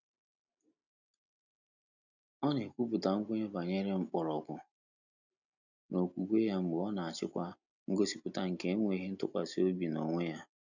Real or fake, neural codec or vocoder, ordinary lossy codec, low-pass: real; none; none; 7.2 kHz